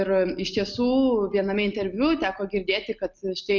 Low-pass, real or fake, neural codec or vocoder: 7.2 kHz; real; none